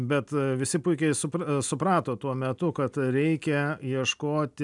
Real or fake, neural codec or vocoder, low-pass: real; none; 10.8 kHz